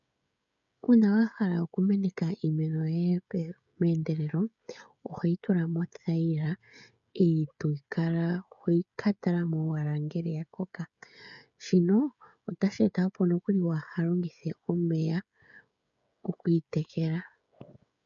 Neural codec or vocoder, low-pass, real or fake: codec, 16 kHz, 16 kbps, FreqCodec, smaller model; 7.2 kHz; fake